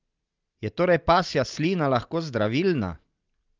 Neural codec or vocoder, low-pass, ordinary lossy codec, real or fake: none; 7.2 kHz; Opus, 32 kbps; real